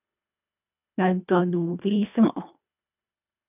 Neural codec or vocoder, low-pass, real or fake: codec, 24 kHz, 1.5 kbps, HILCodec; 3.6 kHz; fake